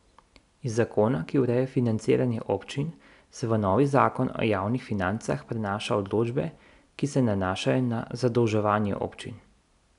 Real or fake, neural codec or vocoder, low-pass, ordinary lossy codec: real; none; 10.8 kHz; none